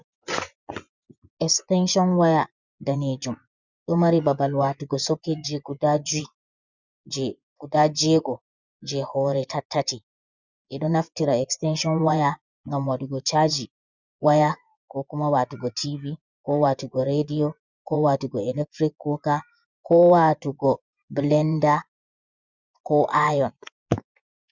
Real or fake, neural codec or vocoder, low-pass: fake; vocoder, 24 kHz, 100 mel bands, Vocos; 7.2 kHz